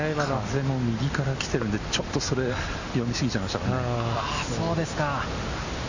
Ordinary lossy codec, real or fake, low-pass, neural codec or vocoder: Opus, 64 kbps; real; 7.2 kHz; none